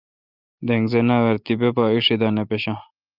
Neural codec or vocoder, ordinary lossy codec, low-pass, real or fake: none; Opus, 24 kbps; 5.4 kHz; real